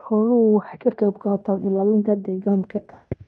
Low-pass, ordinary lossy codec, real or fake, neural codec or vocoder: 10.8 kHz; none; fake; codec, 16 kHz in and 24 kHz out, 0.9 kbps, LongCat-Audio-Codec, fine tuned four codebook decoder